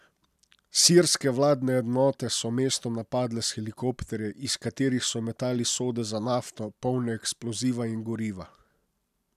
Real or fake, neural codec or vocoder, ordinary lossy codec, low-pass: real; none; none; 14.4 kHz